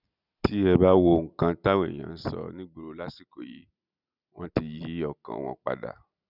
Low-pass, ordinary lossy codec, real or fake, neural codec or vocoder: 5.4 kHz; none; real; none